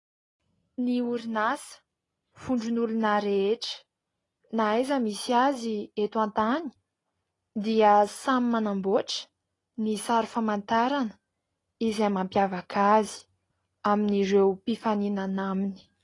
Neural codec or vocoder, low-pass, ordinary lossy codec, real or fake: none; 10.8 kHz; AAC, 32 kbps; real